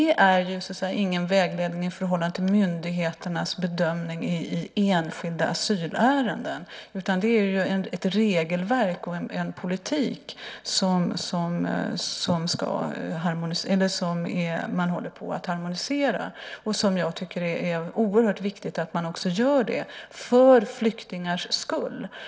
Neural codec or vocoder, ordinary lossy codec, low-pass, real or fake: none; none; none; real